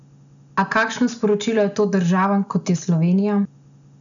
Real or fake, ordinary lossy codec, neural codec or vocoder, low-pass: real; none; none; 7.2 kHz